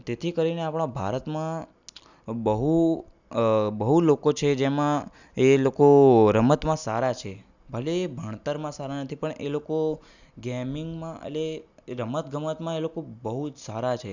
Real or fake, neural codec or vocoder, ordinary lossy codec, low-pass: real; none; none; 7.2 kHz